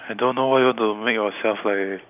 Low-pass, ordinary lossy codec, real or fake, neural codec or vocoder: 3.6 kHz; none; real; none